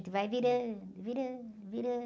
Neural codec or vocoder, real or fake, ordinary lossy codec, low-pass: none; real; none; none